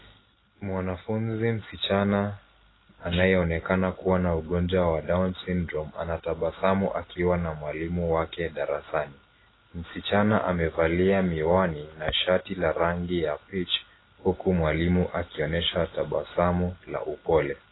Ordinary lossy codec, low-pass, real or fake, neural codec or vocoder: AAC, 16 kbps; 7.2 kHz; real; none